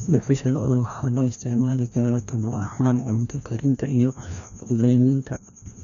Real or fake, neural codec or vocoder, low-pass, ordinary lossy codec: fake; codec, 16 kHz, 1 kbps, FreqCodec, larger model; 7.2 kHz; none